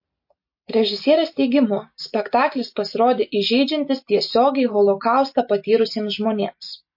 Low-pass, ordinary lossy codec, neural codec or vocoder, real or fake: 5.4 kHz; MP3, 32 kbps; none; real